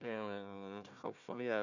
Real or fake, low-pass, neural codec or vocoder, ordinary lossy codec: fake; 7.2 kHz; codec, 16 kHz, 1 kbps, FunCodec, trained on Chinese and English, 50 frames a second; none